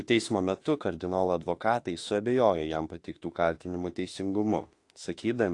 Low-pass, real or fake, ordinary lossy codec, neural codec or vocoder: 10.8 kHz; fake; AAC, 48 kbps; autoencoder, 48 kHz, 32 numbers a frame, DAC-VAE, trained on Japanese speech